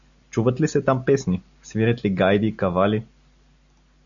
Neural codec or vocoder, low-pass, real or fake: none; 7.2 kHz; real